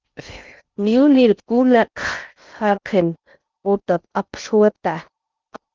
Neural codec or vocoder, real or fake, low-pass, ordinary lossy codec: codec, 16 kHz in and 24 kHz out, 0.6 kbps, FocalCodec, streaming, 4096 codes; fake; 7.2 kHz; Opus, 24 kbps